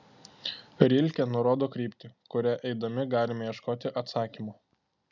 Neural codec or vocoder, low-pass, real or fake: none; 7.2 kHz; real